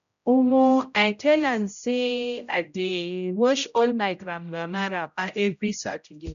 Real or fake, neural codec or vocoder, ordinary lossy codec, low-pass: fake; codec, 16 kHz, 0.5 kbps, X-Codec, HuBERT features, trained on general audio; none; 7.2 kHz